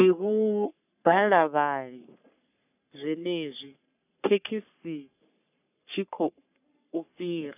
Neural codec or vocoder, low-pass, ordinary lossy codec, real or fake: codec, 44.1 kHz, 3.4 kbps, Pupu-Codec; 3.6 kHz; none; fake